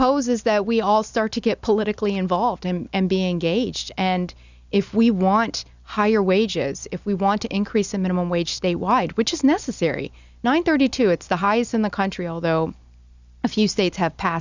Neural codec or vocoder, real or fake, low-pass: none; real; 7.2 kHz